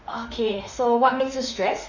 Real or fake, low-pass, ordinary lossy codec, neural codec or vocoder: fake; 7.2 kHz; Opus, 64 kbps; autoencoder, 48 kHz, 32 numbers a frame, DAC-VAE, trained on Japanese speech